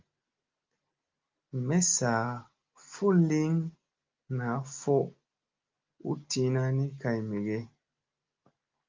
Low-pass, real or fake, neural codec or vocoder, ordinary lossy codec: 7.2 kHz; real; none; Opus, 32 kbps